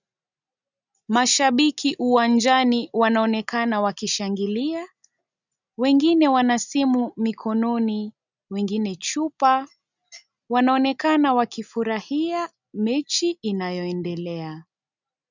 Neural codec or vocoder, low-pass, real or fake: none; 7.2 kHz; real